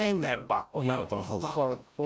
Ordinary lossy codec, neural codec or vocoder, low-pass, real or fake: none; codec, 16 kHz, 0.5 kbps, FreqCodec, larger model; none; fake